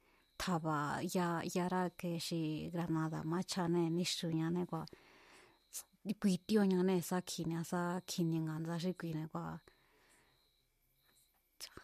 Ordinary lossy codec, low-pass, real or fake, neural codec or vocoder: MP3, 64 kbps; 14.4 kHz; real; none